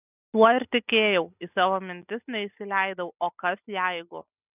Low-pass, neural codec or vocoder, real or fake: 3.6 kHz; none; real